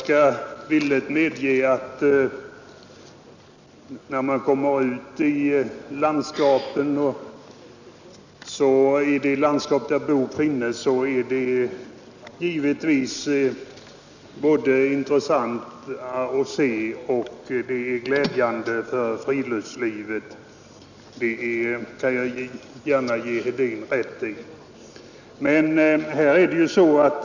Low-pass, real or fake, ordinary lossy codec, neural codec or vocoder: 7.2 kHz; real; none; none